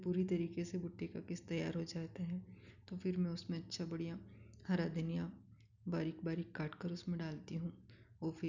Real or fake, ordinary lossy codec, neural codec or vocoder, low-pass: real; none; none; 7.2 kHz